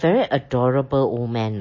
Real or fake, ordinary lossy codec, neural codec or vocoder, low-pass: real; MP3, 32 kbps; none; 7.2 kHz